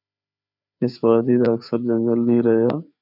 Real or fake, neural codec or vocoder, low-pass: fake; codec, 16 kHz, 4 kbps, FreqCodec, larger model; 5.4 kHz